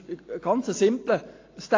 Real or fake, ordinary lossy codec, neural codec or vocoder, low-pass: real; AAC, 32 kbps; none; 7.2 kHz